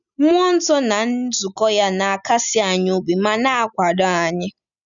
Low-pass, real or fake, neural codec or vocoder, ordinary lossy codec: 7.2 kHz; real; none; none